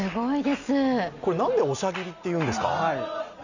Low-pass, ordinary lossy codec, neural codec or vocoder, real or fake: 7.2 kHz; none; none; real